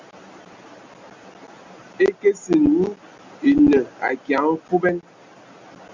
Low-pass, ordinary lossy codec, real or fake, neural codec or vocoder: 7.2 kHz; MP3, 64 kbps; real; none